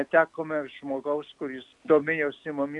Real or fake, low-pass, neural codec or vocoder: real; 10.8 kHz; none